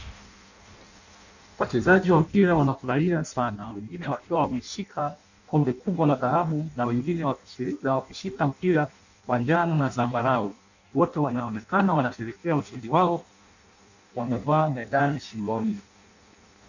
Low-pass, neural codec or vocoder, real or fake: 7.2 kHz; codec, 16 kHz in and 24 kHz out, 0.6 kbps, FireRedTTS-2 codec; fake